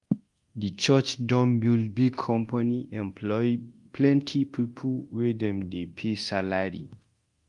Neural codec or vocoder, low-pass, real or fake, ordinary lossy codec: codec, 24 kHz, 0.9 kbps, DualCodec; 10.8 kHz; fake; Opus, 24 kbps